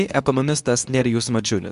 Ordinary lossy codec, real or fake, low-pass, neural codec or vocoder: AAC, 96 kbps; fake; 10.8 kHz; codec, 24 kHz, 0.9 kbps, WavTokenizer, medium speech release version 2